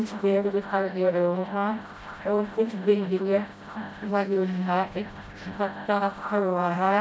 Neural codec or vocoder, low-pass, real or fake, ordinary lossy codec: codec, 16 kHz, 0.5 kbps, FreqCodec, smaller model; none; fake; none